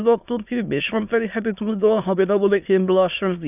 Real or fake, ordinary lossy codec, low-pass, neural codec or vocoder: fake; none; 3.6 kHz; autoencoder, 22.05 kHz, a latent of 192 numbers a frame, VITS, trained on many speakers